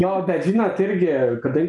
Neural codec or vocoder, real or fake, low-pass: none; real; 10.8 kHz